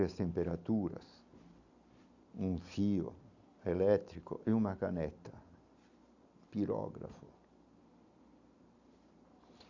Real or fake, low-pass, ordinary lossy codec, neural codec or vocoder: real; 7.2 kHz; none; none